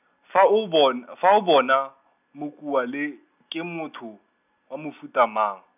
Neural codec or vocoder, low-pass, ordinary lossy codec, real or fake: none; 3.6 kHz; none; real